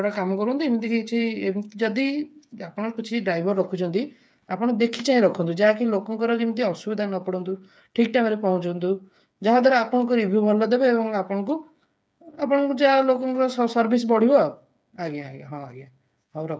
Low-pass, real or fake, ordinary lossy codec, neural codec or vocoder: none; fake; none; codec, 16 kHz, 8 kbps, FreqCodec, smaller model